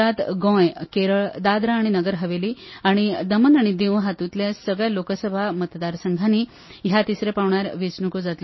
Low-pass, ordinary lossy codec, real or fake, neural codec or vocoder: 7.2 kHz; MP3, 24 kbps; real; none